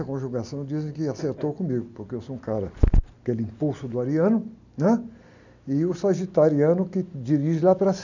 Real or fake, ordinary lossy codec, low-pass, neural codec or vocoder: real; none; 7.2 kHz; none